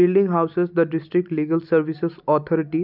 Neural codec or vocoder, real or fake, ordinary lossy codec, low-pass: none; real; none; 5.4 kHz